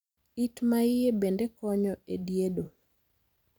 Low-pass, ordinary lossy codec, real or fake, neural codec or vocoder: none; none; fake; vocoder, 44.1 kHz, 128 mel bands every 256 samples, BigVGAN v2